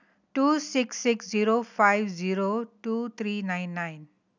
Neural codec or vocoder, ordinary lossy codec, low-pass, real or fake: none; none; 7.2 kHz; real